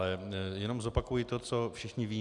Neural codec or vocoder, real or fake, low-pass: none; real; 10.8 kHz